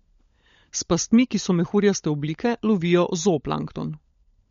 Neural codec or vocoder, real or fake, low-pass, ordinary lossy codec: codec, 16 kHz, 16 kbps, FunCodec, trained on LibriTTS, 50 frames a second; fake; 7.2 kHz; MP3, 48 kbps